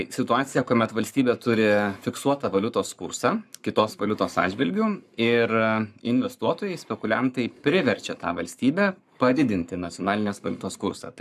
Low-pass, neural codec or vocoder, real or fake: 14.4 kHz; codec, 44.1 kHz, 7.8 kbps, Pupu-Codec; fake